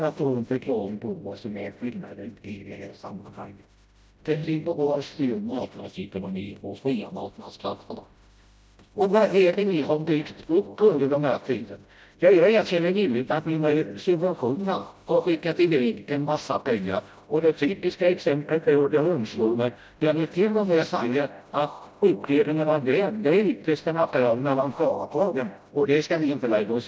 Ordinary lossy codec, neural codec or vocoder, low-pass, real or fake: none; codec, 16 kHz, 0.5 kbps, FreqCodec, smaller model; none; fake